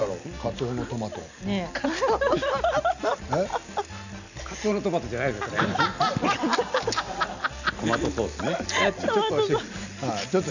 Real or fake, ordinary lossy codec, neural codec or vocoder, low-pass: real; none; none; 7.2 kHz